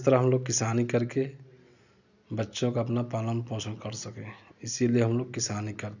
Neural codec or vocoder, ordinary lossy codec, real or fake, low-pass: none; none; real; 7.2 kHz